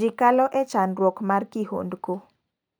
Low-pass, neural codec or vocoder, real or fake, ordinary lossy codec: none; none; real; none